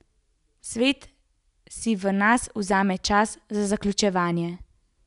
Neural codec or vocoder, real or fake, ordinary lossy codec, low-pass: none; real; none; 10.8 kHz